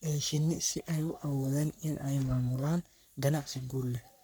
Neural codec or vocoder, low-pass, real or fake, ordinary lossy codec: codec, 44.1 kHz, 3.4 kbps, Pupu-Codec; none; fake; none